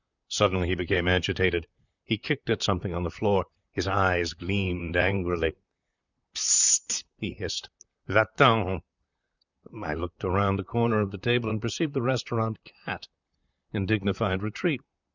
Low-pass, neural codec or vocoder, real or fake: 7.2 kHz; vocoder, 44.1 kHz, 128 mel bands, Pupu-Vocoder; fake